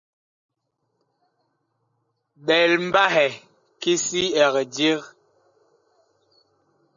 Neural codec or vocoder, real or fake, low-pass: none; real; 7.2 kHz